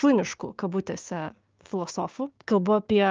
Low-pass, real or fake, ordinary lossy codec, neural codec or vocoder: 7.2 kHz; fake; Opus, 24 kbps; codec, 16 kHz, 6 kbps, DAC